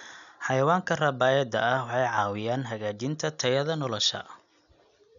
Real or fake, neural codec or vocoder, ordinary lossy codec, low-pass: real; none; none; 7.2 kHz